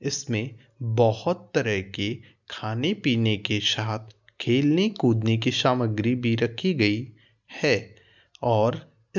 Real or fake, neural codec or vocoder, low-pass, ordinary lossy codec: real; none; 7.2 kHz; none